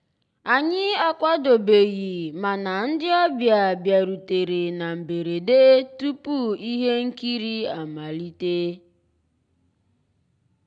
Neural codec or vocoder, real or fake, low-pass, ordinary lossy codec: none; real; 9.9 kHz; none